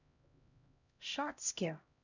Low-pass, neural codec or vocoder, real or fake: 7.2 kHz; codec, 16 kHz, 0.5 kbps, X-Codec, HuBERT features, trained on LibriSpeech; fake